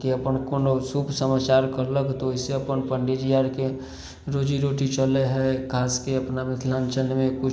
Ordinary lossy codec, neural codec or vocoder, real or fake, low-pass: none; none; real; none